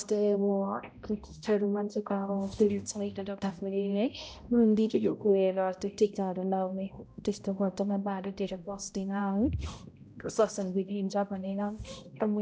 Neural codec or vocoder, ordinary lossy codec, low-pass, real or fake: codec, 16 kHz, 0.5 kbps, X-Codec, HuBERT features, trained on balanced general audio; none; none; fake